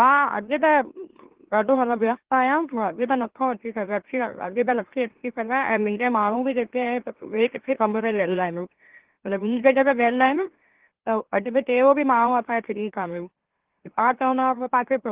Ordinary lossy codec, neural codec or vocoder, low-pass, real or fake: Opus, 16 kbps; autoencoder, 44.1 kHz, a latent of 192 numbers a frame, MeloTTS; 3.6 kHz; fake